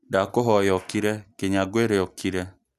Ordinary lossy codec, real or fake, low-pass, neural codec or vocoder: none; real; 14.4 kHz; none